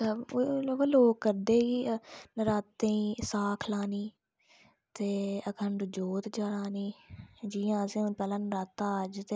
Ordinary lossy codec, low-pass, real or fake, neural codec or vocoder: none; none; real; none